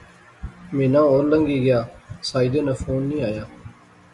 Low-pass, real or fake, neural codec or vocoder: 10.8 kHz; real; none